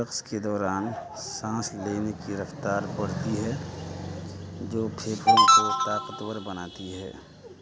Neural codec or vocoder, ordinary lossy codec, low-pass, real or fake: none; none; none; real